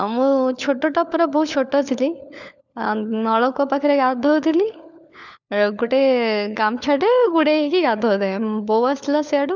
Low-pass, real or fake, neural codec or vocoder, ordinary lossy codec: 7.2 kHz; fake; codec, 16 kHz, 4 kbps, FunCodec, trained on LibriTTS, 50 frames a second; none